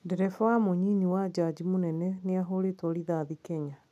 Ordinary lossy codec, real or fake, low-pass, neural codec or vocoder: none; real; 14.4 kHz; none